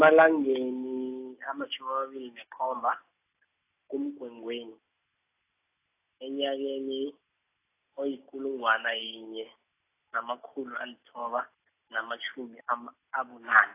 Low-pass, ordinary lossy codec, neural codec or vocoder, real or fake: 3.6 kHz; AAC, 24 kbps; none; real